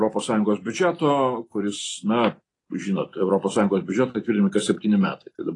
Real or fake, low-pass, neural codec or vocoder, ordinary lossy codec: real; 10.8 kHz; none; AAC, 32 kbps